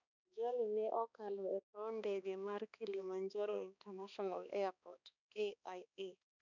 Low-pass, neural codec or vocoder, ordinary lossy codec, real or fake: 7.2 kHz; codec, 16 kHz, 2 kbps, X-Codec, HuBERT features, trained on balanced general audio; none; fake